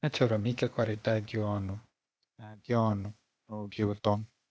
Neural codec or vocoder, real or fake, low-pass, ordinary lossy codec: codec, 16 kHz, 0.8 kbps, ZipCodec; fake; none; none